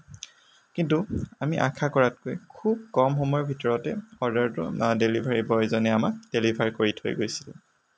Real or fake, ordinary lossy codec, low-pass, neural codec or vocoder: real; none; none; none